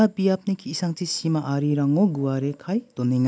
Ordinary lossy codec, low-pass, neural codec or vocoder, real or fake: none; none; none; real